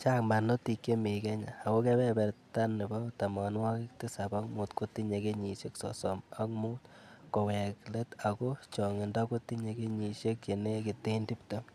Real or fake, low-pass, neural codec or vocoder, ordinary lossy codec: real; 14.4 kHz; none; none